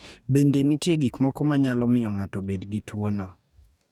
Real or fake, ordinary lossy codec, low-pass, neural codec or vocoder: fake; none; 19.8 kHz; codec, 44.1 kHz, 2.6 kbps, DAC